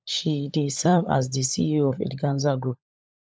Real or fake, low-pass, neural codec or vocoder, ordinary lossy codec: fake; none; codec, 16 kHz, 16 kbps, FunCodec, trained on LibriTTS, 50 frames a second; none